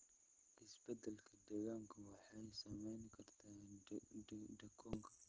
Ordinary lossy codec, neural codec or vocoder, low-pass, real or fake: Opus, 16 kbps; none; 7.2 kHz; real